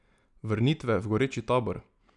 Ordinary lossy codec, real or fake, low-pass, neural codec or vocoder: none; real; 10.8 kHz; none